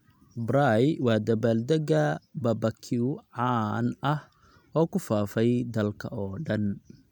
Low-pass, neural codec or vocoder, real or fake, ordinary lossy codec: 19.8 kHz; none; real; none